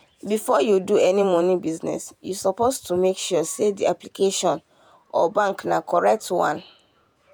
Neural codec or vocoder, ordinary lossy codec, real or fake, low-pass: vocoder, 48 kHz, 128 mel bands, Vocos; none; fake; none